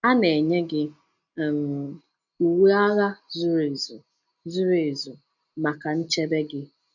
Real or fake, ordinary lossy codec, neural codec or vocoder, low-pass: real; none; none; 7.2 kHz